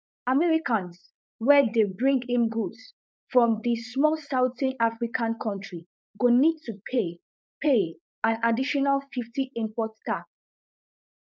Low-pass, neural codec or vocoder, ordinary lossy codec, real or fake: none; codec, 16 kHz, 4.8 kbps, FACodec; none; fake